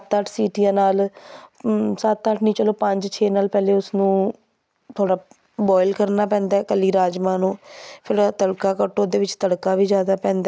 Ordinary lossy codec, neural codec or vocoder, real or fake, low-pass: none; none; real; none